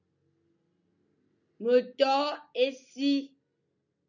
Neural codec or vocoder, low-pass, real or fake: none; 7.2 kHz; real